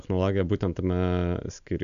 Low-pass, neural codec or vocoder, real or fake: 7.2 kHz; none; real